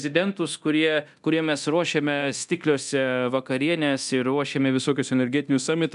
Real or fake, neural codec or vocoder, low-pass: fake; codec, 24 kHz, 0.9 kbps, DualCodec; 10.8 kHz